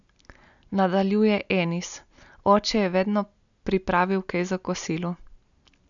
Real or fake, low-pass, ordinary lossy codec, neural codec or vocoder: real; 7.2 kHz; none; none